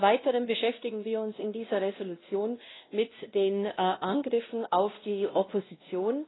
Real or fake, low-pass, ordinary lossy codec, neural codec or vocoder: fake; 7.2 kHz; AAC, 16 kbps; codec, 16 kHz, 1 kbps, X-Codec, WavLM features, trained on Multilingual LibriSpeech